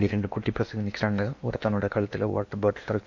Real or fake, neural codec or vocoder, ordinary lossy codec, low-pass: fake; codec, 16 kHz in and 24 kHz out, 0.8 kbps, FocalCodec, streaming, 65536 codes; MP3, 48 kbps; 7.2 kHz